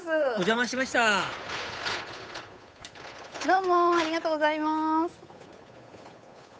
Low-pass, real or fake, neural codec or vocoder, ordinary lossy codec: none; fake; codec, 16 kHz, 8 kbps, FunCodec, trained on Chinese and English, 25 frames a second; none